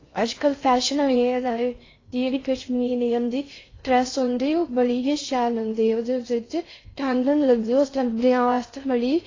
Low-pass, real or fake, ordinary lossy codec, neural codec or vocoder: 7.2 kHz; fake; AAC, 32 kbps; codec, 16 kHz in and 24 kHz out, 0.6 kbps, FocalCodec, streaming, 4096 codes